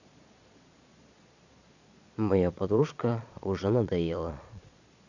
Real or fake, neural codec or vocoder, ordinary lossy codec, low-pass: fake; vocoder, 22.05 kHz, 80 mel bands, Vocos; none; 7.2 kHz